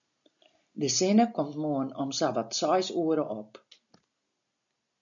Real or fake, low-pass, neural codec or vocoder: real; 7.2 kHz; none